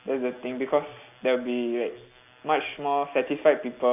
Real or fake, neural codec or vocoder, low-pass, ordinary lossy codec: real; none; 3.6 kHz; none